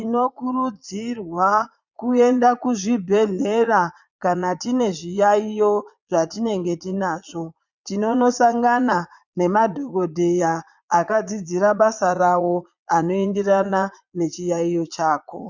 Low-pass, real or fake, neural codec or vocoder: 7.2 kHz; fake; vocoder, 22.05 kHz, 80 mel bands, Vocos